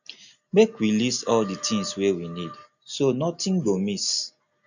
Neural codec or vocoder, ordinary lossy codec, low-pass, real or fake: none; none; 7.2 kHz; real